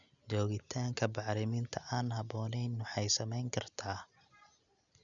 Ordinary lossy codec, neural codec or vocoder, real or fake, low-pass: none; none; real; 7.2 kHz